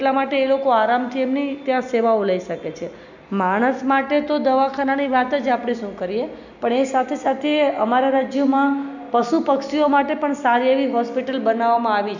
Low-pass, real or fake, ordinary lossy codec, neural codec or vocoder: 7.2 kHz; real; none; none